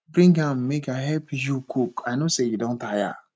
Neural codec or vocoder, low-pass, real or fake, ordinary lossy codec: none; none; real; none